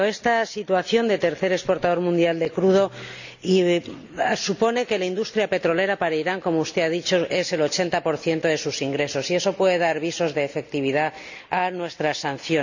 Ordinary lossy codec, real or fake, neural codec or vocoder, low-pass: none; real; none; 7.2 kHz